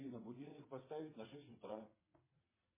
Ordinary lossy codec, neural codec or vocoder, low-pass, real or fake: MP3, 16 kbps; vocoder, 22.05 kHz, 80 mel bands, WaveNeXt; 3.6 kHz; fake